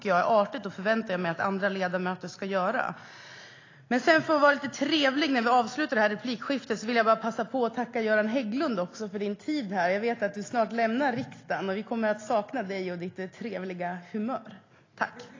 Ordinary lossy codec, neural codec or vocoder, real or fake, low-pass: AAC, 32 kbps; none; real; 7.2 kHz